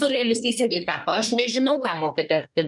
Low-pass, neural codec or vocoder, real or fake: 10.8 kHz; codec, 24 kHz, 1 kbps, SNAC; fake